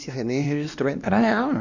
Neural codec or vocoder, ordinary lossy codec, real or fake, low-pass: codec, 16 kHz, 1 kbps, X-Codec, WavLM features, trained on Multilingual LibriSpeech; none; fake; 7.2 kHz